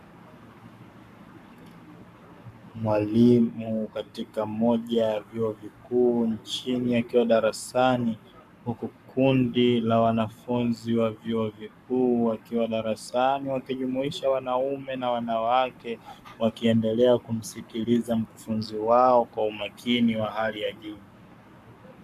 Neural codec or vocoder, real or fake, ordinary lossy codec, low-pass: codec, 44.1 kHz, 7.8 kbps, Pupu-Codec; fake; MP3, 96 kbps; 14.4 kHz